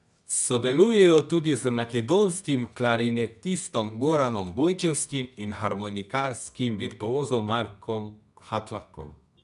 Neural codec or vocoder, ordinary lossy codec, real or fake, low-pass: codec, 24 kHz, 0.9 kbps, WavTokenizer, medium music audio release; none; fake; 10.8 kHz